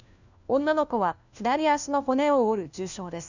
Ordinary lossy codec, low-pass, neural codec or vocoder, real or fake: none; 7.2 kHz; codec, 16 kHz, 1 kbps, FunCodec, trained on LibriTTS, 50 frames a second; fake